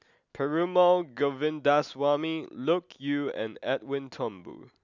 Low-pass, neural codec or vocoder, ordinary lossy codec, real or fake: 7.2 kHz; none; none; real